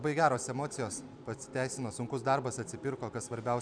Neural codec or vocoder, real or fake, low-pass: vocoder, 44.1 kHz, 128 mel bands every 256 samples, BigVGAN v2; fake; 9.9 kHz